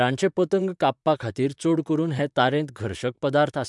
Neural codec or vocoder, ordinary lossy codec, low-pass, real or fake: vocoder, 44.1 kHz, 128 mel bands, Pupu-Vocoder; MP3, 96 kbps; 10.8 kHz; fake